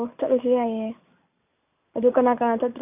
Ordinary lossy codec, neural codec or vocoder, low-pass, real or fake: none; none; 3.6 kHz; real